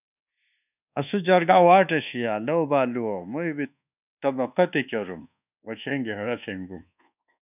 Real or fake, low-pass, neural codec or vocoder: fake; 3.6 kHz; codec, 24 kHz, 1.2 kbps, DualCodec